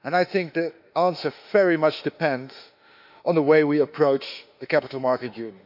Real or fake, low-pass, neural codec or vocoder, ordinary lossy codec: fake; 5.4 kHz; autoencoder, 48 kHz, 32 numbers a frame, DAC-VAE, trained on Japanese speech; none